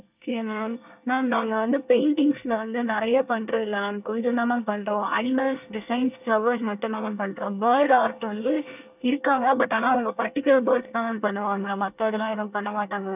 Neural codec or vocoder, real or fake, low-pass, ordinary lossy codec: codec, 24 kHz, 1 kbps, SNAC; fake; 3.6 kHz; none